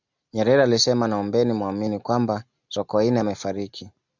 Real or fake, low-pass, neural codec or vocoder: real; 7.2 kHz; none